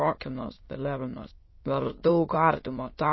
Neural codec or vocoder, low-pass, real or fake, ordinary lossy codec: autoencoder, 22.05 kHz, a latent of 192 numbers a frame, VITS, trained on many speakers; 7.2 kHz; fake; MP3, 24 kbps